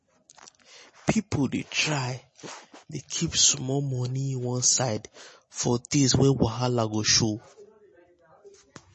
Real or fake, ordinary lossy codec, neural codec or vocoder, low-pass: real; MP3, 32 kbps; none; 10.8 kHz